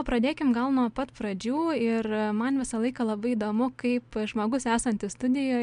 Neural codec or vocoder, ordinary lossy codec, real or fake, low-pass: none; MP3, 64 kbps; real; 9.9 kHz